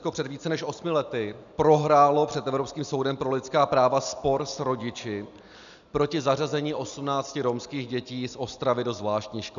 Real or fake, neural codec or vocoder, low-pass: real; none; 7.2 kHz